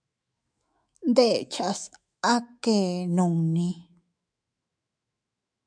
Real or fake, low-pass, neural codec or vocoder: fake; 9.9 kHz; autoencoder, 48 kHz, 128 numbers a frame, DAC-VAE, trained on Japanese speech